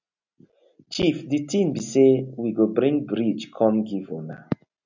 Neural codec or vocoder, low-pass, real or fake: none; 7.2 kHz; real